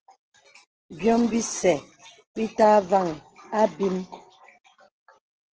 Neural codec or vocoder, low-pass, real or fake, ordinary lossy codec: none; 7.2 kHz; real; Opus, 16 kbps